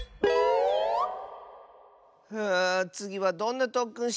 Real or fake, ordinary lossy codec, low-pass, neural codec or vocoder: real; none; none; none